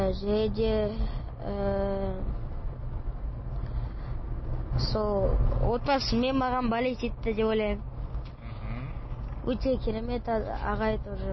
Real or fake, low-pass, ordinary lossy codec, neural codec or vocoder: real; 7.2 kHz; MP3, 24 kbps; none